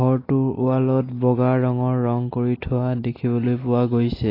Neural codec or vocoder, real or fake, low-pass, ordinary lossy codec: none; real; 5.4 kHz; AAC, 24 kbps